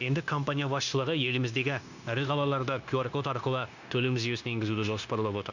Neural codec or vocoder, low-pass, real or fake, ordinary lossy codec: codec, 16 kHz, 0.9 kbps, LongCat-Audio-Codec; 7.2 kHz; fake; none